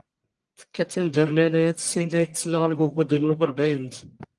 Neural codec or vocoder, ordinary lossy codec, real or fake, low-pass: codec, 44.1 kHz, 1.7 kbps, Pupu-Codec; Opus, 24 kbps; fake; 10.8 kHz